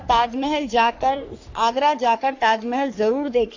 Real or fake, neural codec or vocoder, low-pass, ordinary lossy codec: fake; codec, 44.1 kHz, 3.4 kbps, Pupu-Codec; 7.2 kHz; AAC, 48 kbps